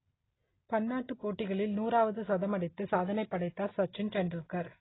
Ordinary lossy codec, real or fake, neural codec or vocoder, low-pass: AAC, 16 kbps; real; none; 7.2 kHz